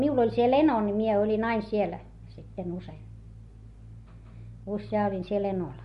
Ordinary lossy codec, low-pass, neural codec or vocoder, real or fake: MP3, 48 kbps; 14.4 kHz; none; real